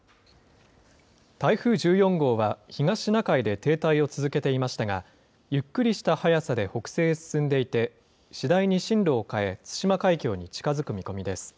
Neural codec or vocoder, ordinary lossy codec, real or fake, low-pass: none; none; real; none